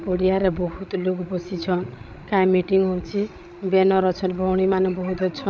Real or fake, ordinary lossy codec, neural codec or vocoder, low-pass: fake; none; codec, 16 kHz, 16 kbps, FreqCodec, larger model; none